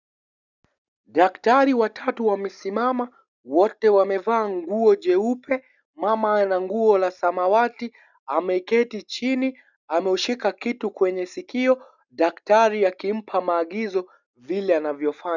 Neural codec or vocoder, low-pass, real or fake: none; 7.2 kHz; real